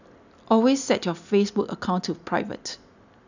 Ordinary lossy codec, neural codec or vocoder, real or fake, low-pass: none; none; real; 7.2 kHz